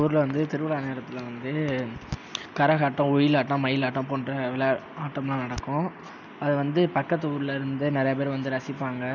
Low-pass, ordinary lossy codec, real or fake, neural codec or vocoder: 7.2 kHz; none; real; none